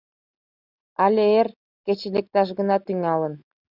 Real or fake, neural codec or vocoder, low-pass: real; none; 5.4 kHz